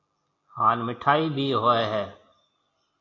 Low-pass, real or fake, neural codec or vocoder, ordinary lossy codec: 7.2 kHz; real; none; AAC, 32 kbps